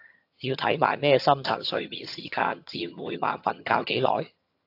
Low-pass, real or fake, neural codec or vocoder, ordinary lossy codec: 5.4 kHz; fake; vocoder, 22.05 kHz, 80 mel bands, HiFi-GAN; AAC, 32 kbps